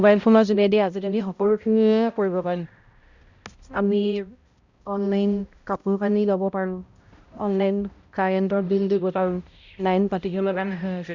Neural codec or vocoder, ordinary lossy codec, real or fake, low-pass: codec, 16 kHz, 0.5 kbps, X-Codec, HuBERT features, trained on balanced general audio; Opus, 64 kbps; fake; 7.2 kHz